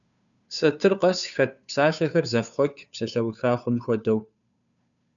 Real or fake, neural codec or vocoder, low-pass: fake; codec, 16 kHz, 2 kbps, FunCodec, trained on Chinese and English, 25 frames a second; 7.2 kHz